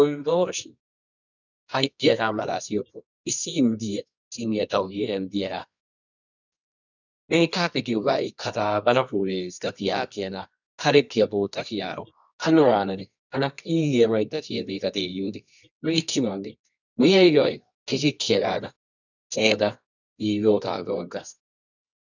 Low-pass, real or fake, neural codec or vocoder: 7.2 kHz; fake; codec, 24 kHz, 0.9 kbps, WavTokenizer, medium music audio release